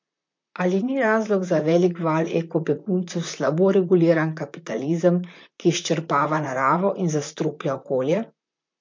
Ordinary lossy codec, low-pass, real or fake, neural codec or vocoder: MP3, 48 kbps; 7.2 kHz; fake; vocoder, 44.1 kHz, 128 mel bands, Pupu-Vocoder